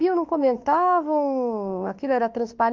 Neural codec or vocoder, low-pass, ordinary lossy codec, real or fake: autoencoder, 48 kHz, 32 numbers a frame, DAC-VAE, trained on Japanese speech; 7.2 kHz; Opus, 32 kbps; fake